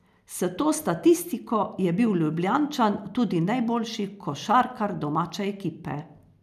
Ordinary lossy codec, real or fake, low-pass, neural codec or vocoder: none; real; 14.4 kHz; none